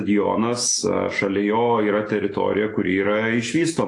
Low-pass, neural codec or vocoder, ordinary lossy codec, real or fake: 10.8 kHz; autoencoder, 48 kHz, 128 numbers a frame, DAC-VAE, trained on Japanese speech; AAC, 48 kbps; fake